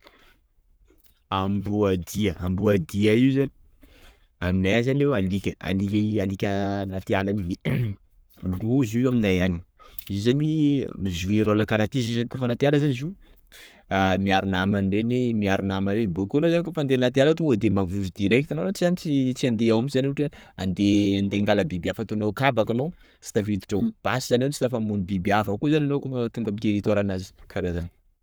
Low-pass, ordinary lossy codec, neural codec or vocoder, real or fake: none; none; codec, 44.1 kHz, 7.8 kbps, Pupu-Codec; fake